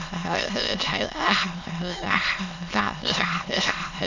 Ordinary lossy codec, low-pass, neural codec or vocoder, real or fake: none; 7.2 kHz; autoencoder, 22.05 kHz, a latent of 192 numbers a frame, VITS, trained on many speakers; fake